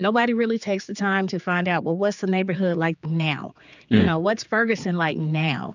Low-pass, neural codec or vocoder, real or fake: 7.2 kHz; codec, 16 kHz, 4 kbps, X-Codec, HuBERT features, trained on general audio; fake